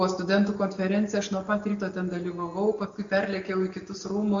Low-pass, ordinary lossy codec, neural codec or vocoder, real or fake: 7.2 kHz; MP3, 48 kbps; none; real